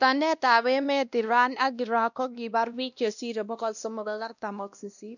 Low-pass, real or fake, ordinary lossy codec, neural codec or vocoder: 7.2 kHz; fake; none; codec, 16 kHz, 1 kbps, X-Codec, WavLM features, trained on Multilingual LibriSpeech